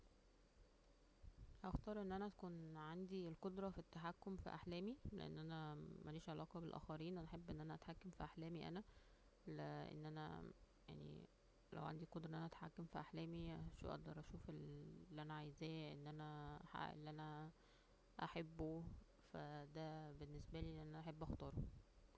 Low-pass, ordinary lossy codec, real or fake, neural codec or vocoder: none; none; real; none